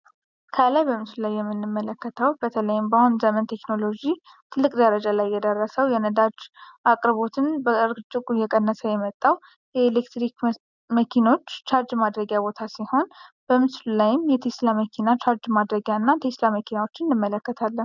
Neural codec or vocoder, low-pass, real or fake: none; 7.2 kHz; real